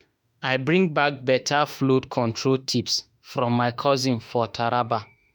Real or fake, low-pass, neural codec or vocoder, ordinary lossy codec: fake; none; autoencoder, 48 kHz, 32 numbers a frame, DAC-VAE, trained on Japanese speech; none